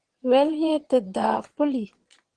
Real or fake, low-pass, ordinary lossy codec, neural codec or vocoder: fake; 9.9 kHz; Opus, 16 kbps; vocoder, 22.05 kHz, 80 mel bands, Vocos